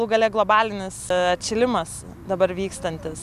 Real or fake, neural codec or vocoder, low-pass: real; none; 14.4 kHz